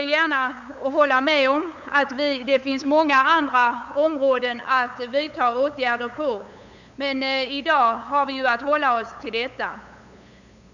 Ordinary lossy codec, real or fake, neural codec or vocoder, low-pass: none; fake; codec, 16 kHz, 8 kbps, FunCodec, trained on LibriTTS, 25 frames a second; 7.2 kHz